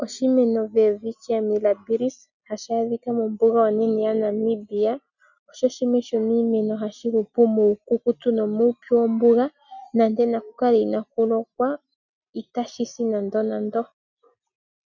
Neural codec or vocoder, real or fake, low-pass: none; real; 7.2 kHz